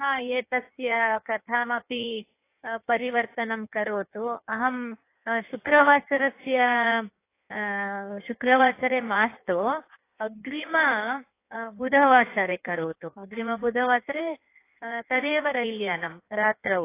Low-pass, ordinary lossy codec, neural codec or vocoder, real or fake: 3.6 kHz; AAC, 24 kbps; codec, 16 kHz in and 24 kHz out, 1.1 kbps, FireRedTTS-2 codec; fake